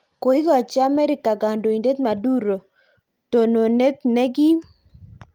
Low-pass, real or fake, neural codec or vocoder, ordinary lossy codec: 19.8 kHz; real; none; Opus, 32 kbps